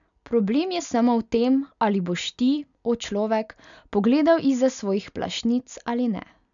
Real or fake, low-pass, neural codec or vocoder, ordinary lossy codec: real; 7.2 kHz; none; none